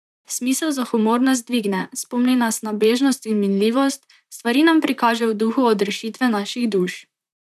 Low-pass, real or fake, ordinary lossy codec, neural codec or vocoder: 14.4 kHz; fake; none; vocoder, 44.1 kHz, 128 mel bands, Pupu-Vocoder